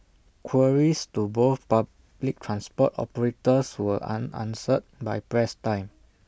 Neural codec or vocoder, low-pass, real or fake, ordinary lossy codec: none; none; real; none